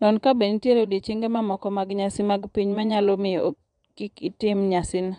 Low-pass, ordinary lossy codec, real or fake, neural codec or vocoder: 9.9 kHz; none; fake; vocoder, 22.05 kHz, 80 mel bands, Vocos